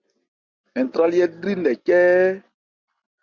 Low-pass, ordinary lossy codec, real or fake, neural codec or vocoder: 7.2 kHz; Opus, 64 kbps; real; none